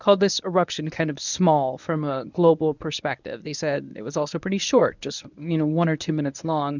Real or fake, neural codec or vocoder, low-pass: fake; codec, 24 kHz, 0.9 kbps, WavTokenizer, medium speech release version 1; 7.2 kHz